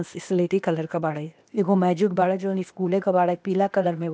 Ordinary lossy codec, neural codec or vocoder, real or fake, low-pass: none; codec, 16 kHz, 0.8 kbps, ZipCodec; fake; none